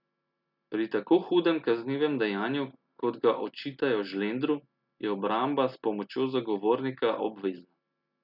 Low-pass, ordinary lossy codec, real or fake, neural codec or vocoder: 5.4 kHz; none; real; none